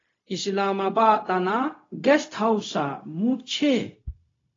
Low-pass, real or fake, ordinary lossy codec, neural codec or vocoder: 7.2 kHz; fake; AAC, 32 kbps; codec, 16 kHz, 0.4 kbps, LongCat-Audio-Codec